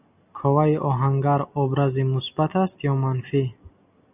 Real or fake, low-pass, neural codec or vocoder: real; 3.6 kHz; none